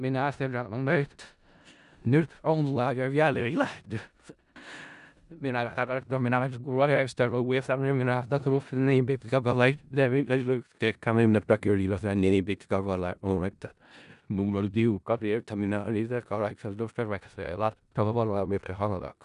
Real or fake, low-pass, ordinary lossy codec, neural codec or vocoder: fake; 10.8 kHz; none; codec, 16 kHz in and 24 kHz out, 0.4 kbps, LongCat-Audio-Codec, four codebook decoder